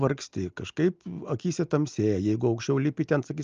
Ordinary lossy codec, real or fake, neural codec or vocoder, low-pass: Opus, 24 kbps; real; none; 7.2 kHz